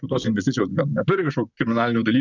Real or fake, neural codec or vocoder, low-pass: fake; vocoder, 44.1 kHz, 128 mel bands, Pupu-Vocoder; 7.2 kHz